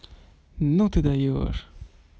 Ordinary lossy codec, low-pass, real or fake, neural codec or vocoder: none; none; real; none